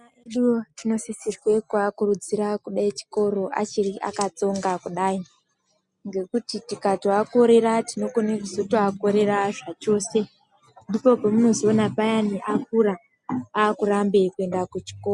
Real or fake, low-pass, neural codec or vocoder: real; 10.8 kHz; none